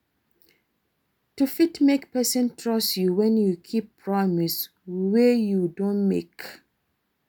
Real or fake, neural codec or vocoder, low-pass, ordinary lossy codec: real; none; none; none